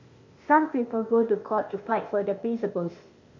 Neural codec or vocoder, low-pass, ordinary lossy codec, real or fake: codec, 16 kHz, 0.8 kbps, ZipCodec; 7.2 kHz; MP3, 48 kbps; fake